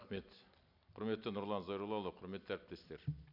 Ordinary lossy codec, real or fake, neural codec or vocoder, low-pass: none; real; none; 5.4 kHz